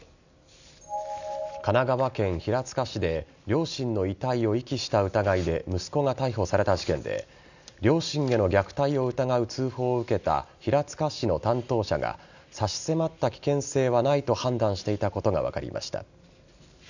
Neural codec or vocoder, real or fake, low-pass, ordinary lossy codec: none; real; 7.2 kHz; none